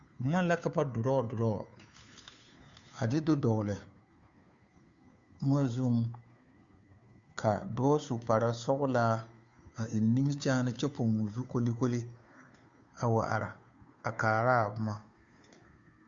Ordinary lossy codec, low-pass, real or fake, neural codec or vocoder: Opus, 64 kbps; 7.2 kHz; fake; codec, 16 kHz, 2 kbps, FunCodec, trained on Chinese and English, 25 frames a second